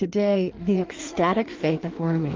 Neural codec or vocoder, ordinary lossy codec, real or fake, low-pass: codec, 16 kHz in and 24 kHz out, 1.1 kbps, FireRedTTS-2 codec; Opus, 32 kbps; fake; 7.2 kHz